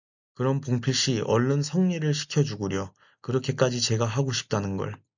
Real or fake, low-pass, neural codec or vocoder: real; 7.2 kHz; none